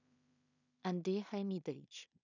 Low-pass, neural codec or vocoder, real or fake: 7.2 kHz; codec, 16 kHz in and 24 kHz out, 0.4 kbps, LongCat-Audio-Codec, two codebook decoder; fake